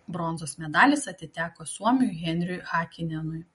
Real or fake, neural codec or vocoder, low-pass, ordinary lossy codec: real; none; 10.8 kHz; MP3, 48 kbps